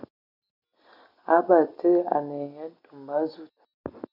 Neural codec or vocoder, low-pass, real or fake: none; 5.4 kHz; real